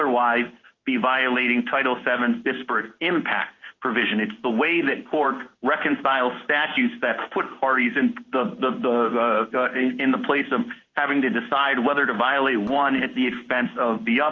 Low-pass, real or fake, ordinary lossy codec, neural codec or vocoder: 7.2 kHz; fake; Opus, 24 kbps; codec, 16 kHz in and 24 kHz out, 1 kbps, XY-Tokenizer